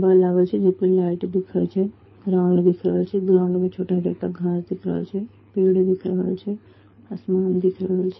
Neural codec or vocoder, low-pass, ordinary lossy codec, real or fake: codec, 24 kHz, 6 kbps, HILCodec; 7.2 kHz; MP3, 24 kbps; fake